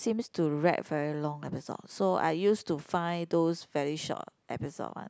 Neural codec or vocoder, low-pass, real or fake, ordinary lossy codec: none; none; real; none